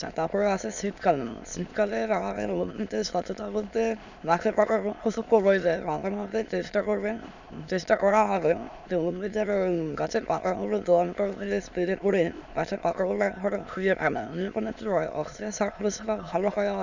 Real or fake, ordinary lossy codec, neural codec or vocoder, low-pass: fake; none; autoencoder, 22.05 kHz, a latent of 192 numbers a frame, VITS, trained on many speakers; 7.2 kHz